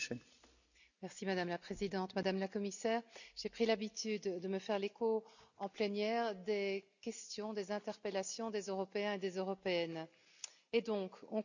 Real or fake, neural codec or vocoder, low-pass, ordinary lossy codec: real; none; 7.2 kHz; none